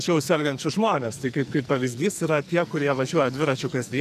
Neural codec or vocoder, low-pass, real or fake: codec, 44.1 kHz, 2.6 kbps, SNAC; 14.4 kHz; fake